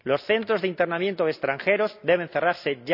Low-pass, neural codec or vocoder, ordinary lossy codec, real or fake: 5.4 kHz; none; none; real